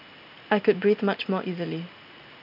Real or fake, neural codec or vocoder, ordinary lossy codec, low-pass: real; none; none; 5.4 kHz